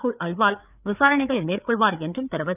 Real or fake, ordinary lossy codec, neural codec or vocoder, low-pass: fake; none; codec, 16 kHz, 4 kbps, FreqCodec, larger model; 3.6 kHz